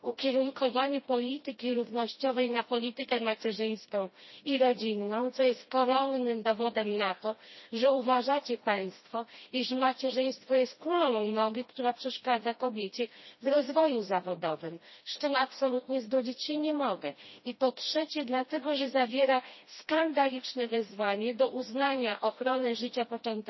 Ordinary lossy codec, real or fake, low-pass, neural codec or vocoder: MP3, 24 kbps; fake; 7.2 kHz; codec, 16 kHz, 1 kbps, FreqCodec, smaller model